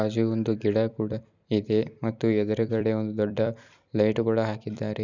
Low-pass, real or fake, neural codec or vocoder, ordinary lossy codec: 7.2 kHz; fake; vocoder, 44.1 kHz, 128 mel bands every 256 samples, BigVGAN v2; none